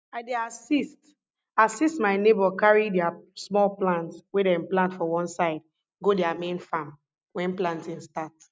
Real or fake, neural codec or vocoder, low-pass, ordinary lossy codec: real; none; none; none